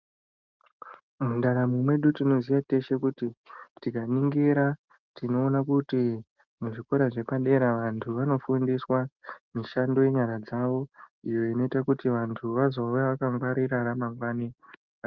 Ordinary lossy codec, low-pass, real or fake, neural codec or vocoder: Opus, 32 kbps; 7.2 kHz; real; none